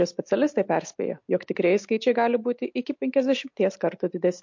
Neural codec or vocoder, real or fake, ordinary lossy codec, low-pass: none; real; MP3, 48 kbps; 7.2 kHz